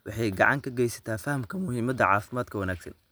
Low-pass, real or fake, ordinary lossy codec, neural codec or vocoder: none; real; none; none